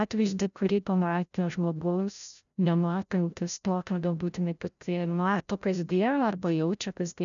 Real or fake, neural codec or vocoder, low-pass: fake; codec, 16 kHz, 0.5 kbps, FreqCodec, larger model; 7.2 kHz